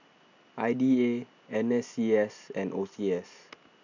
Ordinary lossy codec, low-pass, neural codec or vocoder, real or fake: none; 7.2 kHz; none; real